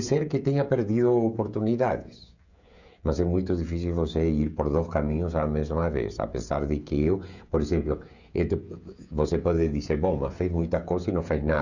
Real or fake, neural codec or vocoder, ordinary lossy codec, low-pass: fake; codec, 16 kHz, 16 kbps, FreqCodec, smaller model; none; 7.2 kHz